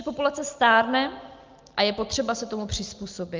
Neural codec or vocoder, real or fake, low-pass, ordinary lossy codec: none; real; 7.2 kHz; Opus, 24 kbps